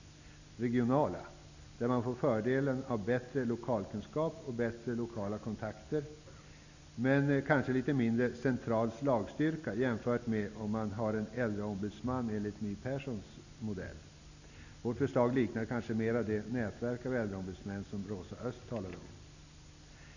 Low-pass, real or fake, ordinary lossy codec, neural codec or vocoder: 7.2 kHz; real; none; none